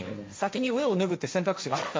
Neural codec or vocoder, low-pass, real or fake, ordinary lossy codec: codec, 16 kHz, 1.1 kbps, Voila-Tokenizer; none; fake; none